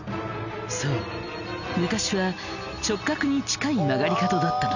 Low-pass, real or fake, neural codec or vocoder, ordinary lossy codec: 7.2 kHz; real; none; none